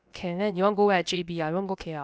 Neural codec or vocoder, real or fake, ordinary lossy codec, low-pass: codec, 16 kHz, about 1 kbps, DyCAST, with the encoder's durations; fake; none; none